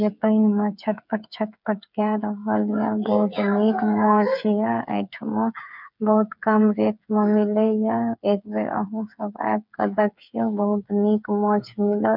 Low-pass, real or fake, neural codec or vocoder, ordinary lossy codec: 5.4 kHz; fake; codec, 16 kHz, 8 kbps, FreqCodec, smaller model; none